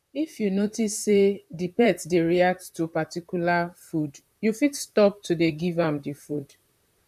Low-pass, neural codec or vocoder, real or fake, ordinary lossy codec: 14.4 kHz; vocoder, 44.1 kHz, 128 mel bands, Pupu-Vocoder; fake; none